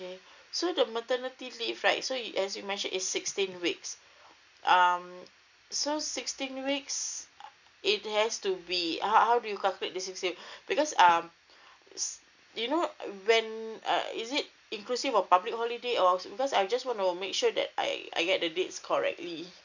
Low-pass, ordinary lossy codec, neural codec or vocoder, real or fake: 7.2 kHz; none; none; real